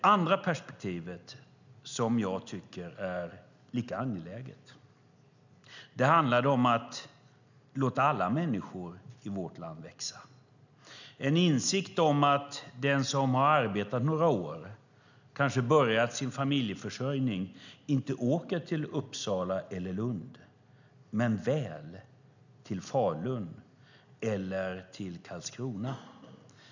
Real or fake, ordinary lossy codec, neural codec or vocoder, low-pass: real; none; none; 7.2 kHz